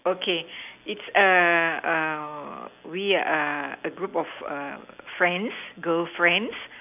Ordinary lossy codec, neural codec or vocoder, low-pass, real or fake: none; none; 3.6 kHz; real